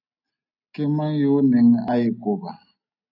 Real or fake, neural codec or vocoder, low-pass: real; none; 5.4 kHz